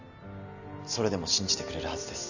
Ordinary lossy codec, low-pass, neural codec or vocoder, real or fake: none; 7.2 kHz; none; real